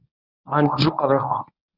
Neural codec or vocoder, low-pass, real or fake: codec, 24 kHz, 0.9 kbps, WavTokenizer, medium speech release version 1; 5.4 kHz; fake